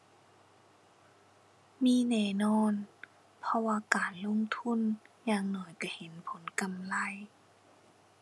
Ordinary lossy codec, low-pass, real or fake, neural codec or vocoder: none; none; real; none